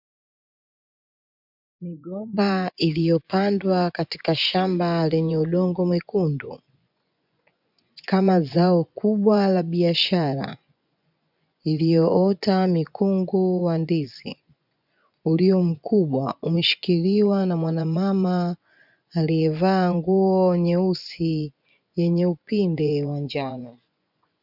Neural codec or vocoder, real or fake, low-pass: vocoder, 24 kHz, 100 mel bands, Vocos; fake; 5.4 kHz